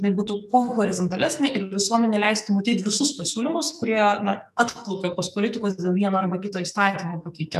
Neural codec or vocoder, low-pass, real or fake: codec, 44.1 kHz, 2.6 kbps, SNAC; 14.4 kHz; fake